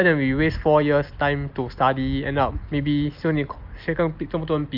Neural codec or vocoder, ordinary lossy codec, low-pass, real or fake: none; Opus, 24 kbps; 5.4 kHz; real